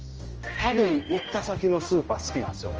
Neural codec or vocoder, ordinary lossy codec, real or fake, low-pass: codec, 16 kHz, 1 kbps, X-Codec, HuBERT features, trained on general audio; Opus, 24 kbps; fake; 7.2 kHz